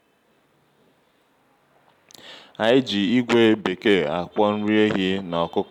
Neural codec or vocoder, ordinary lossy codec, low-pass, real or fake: none; none; 19.8 kHz; real